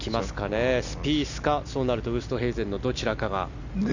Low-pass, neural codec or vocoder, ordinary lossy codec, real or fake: 7.2 kHz; none; none; real